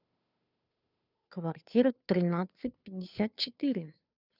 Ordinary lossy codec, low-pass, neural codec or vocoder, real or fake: none; 5.4 kHz; codec, 16 kHz, 2 kbps, FunCodec, trained on Chinese and English, 25 frames a second; fake